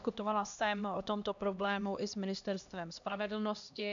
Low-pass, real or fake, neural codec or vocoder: 7.2 kHz; fake; codec, 16 kHz, 1 kbps, X-Codec, HuBERT features, trained on LibriSpeech